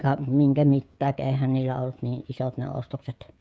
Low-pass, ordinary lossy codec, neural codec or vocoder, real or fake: none; none; codec, 16 kHz, 16 kbps, FreqCodec, smaller model; fake